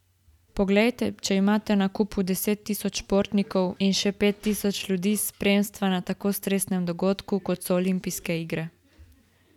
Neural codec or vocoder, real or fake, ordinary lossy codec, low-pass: none; real; none; 19.8 kHz